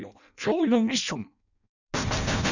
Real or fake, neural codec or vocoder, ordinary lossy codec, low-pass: fake; codec, 16 kHz in and 24 kHz out, 0.6 kbps, FireRedTTS-2 codec; none; 7.2 kHz